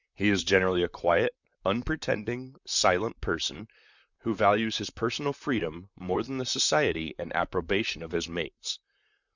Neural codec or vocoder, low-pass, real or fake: vocoder, 44.1 kHz, 128 mel bands, Pupu-Vocoder; 7.2 kHz; fake